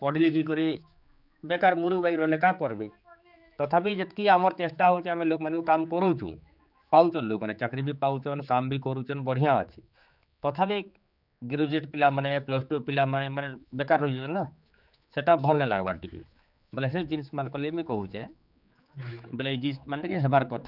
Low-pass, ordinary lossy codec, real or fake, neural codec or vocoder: 5.4 kHz; none; fake; codec, 16 kHz, 4 kbps, X-Codec, HuBERT features, trained on general audio